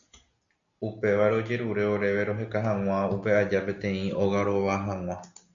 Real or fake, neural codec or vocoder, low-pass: real; none; 7.2 kHz